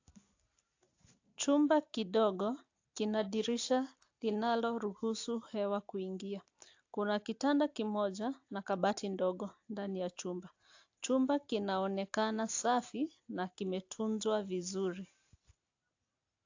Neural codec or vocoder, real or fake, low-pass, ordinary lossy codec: none; real; 7.2 kHz; AAC, 48 kbps